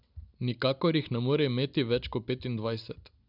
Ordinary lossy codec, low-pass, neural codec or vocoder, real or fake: none; 5.4 kHz; none; real